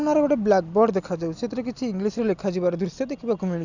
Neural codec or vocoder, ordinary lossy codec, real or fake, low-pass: none; none; real; 7.2 kHz